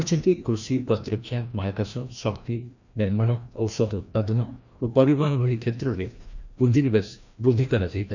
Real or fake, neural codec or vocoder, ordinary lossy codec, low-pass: fake; codec, 16 kHz, 1 kbps, FreqCodec, larger model; none; 7.2 kHz